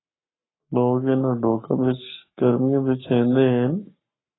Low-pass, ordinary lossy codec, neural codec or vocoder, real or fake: 7.2 kHz; AAC, 16 kbps; codec, 44.1 kHz, 7.8 kbps, Pupu-Codec; fake